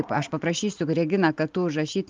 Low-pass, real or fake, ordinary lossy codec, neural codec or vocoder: 7.2 kHz; real; Opus, 32 kbps; none